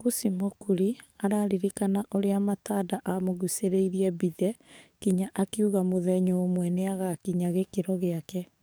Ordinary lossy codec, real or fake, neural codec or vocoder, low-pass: none; fake; codec, 44.1 kHz, 7.8 kbps, DAC; none